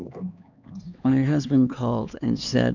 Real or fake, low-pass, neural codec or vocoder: fake; 7.2 kHz; codec, 16 kHz, 2 kbps, X-Codec, HuBERT features, trained on LibriSpeech